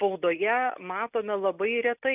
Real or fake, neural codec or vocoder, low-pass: real; none; 3.6 kHz